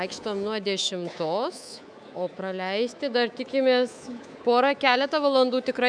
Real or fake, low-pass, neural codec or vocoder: fake; 9.9 kHz; codec, 24 kHz, 3.1 kbps, DualCodec